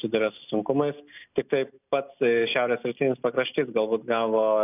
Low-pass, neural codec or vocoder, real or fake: 3.6 kHz; none; real